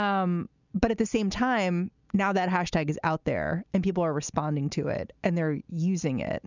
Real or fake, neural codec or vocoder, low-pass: real; none; 7.2 kHz